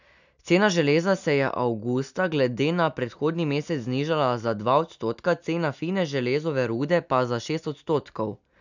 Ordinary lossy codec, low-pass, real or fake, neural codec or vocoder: none; 7.2 kHz; real; none